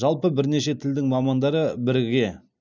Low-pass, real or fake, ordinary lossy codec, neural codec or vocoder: 7.2 kHz; real; none; none